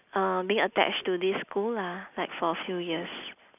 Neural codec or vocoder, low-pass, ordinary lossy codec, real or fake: none; 3.6 kHz; none; real